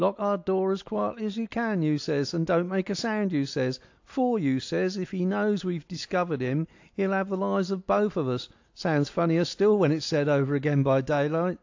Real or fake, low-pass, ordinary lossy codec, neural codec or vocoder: real; 7.2 kHz; AAC, 48 kbps; none